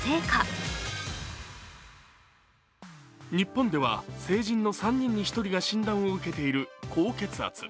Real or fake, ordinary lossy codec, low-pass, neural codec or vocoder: real; none; none; none